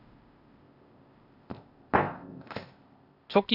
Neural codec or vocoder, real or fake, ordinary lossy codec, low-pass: codec, 16 kHz, 0.8 kbps, ZipCodec; fake; none; 5.4 kHz